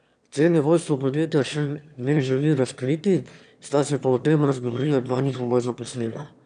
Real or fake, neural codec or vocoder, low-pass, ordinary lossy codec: fake; autoencoder, 22.05 kHz, a latent of 192 numbers a frame, VITS, trained on one speaker; 9.9 kHz; none